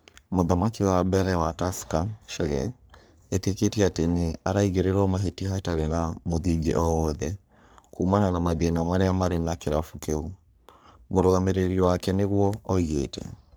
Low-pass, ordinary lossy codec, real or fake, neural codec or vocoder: none; none; fake; codec, 44.1 kHz, 3.4 kbps, Pupu-Codec